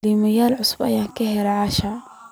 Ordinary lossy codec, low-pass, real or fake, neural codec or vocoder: none; none; real; none